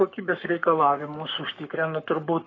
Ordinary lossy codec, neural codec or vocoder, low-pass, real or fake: AAC, 32 kbps; codec, 44.1 kHz, 7.8 kbps, Pupu-Codec; 7.2 kHz; fake